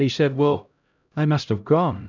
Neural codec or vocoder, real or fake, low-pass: codec, 16 kHz, 0.5 kbps, X-Codec, HuBERT features, trained on LibriSpeech; fake; 7.2 kHz